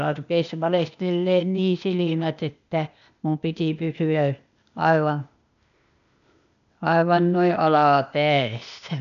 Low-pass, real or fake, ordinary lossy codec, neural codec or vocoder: 7.2 kHz; fake; none; codec, 16 kHz, 0.8 kbps, ZipCodec